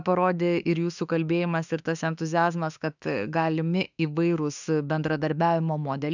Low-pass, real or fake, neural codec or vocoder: 7.2 kHz; fake; autoencoder, 48 kHz, 32 numbers a frame, DAC-VAE, trained on Japanese speech